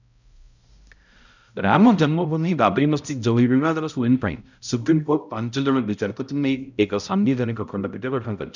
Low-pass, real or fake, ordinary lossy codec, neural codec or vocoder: 7.2 kHz; fake; none; codec, 16 kHz, 0.5 kbps, X-Codec, HuBERT features, trained on balanced general audio